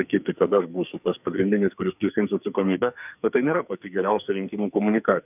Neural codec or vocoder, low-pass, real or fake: codec, 44.1 kHz, 2.6 kbps, SNAC; 3.6 kHz; fake